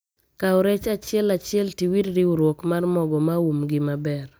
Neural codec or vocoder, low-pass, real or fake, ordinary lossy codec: none; none; real; none